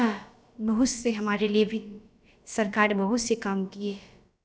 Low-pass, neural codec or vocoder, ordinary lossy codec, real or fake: none; codec, 16 kHz, about 1 kbps, DyCAST, with the encoder's durations; none; fake